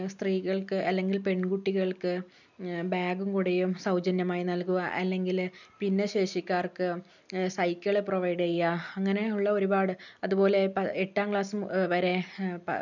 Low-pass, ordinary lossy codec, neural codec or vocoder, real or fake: 7.2 kHz; none; none; real